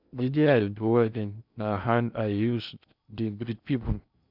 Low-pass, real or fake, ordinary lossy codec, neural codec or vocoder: 5.4 kHz; fake; none; codec, 16 kHz in and 24 kHz out, 0.6 kbps, FocalCodec, streaming, 4096 codes